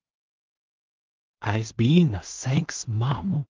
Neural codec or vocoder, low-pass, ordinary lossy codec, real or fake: codec, 16 kHz in and 24 kHz out, 0.4 kbps, LongCat-Audio-Codec, two codebook decoder; 7.2 kHz; Opus, 32 kbps; fake